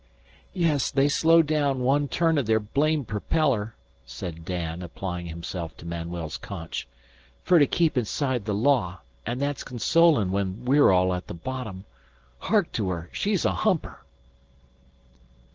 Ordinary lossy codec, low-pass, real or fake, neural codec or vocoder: Opus, 16 kbps; 7.2 kHz; real; none